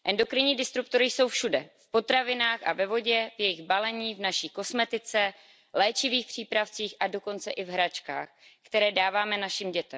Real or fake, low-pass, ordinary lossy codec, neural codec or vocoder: real; none; none; none